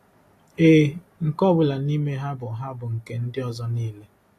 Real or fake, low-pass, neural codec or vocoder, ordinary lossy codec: real; 14.4 kHz; none; AAC, 48 kbps